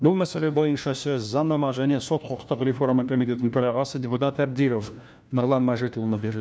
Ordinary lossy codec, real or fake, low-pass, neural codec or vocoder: none; fake; none; codec, 16 kHz, 1 kbps, FunCodec, trained on LibriTTS, 50 frames a second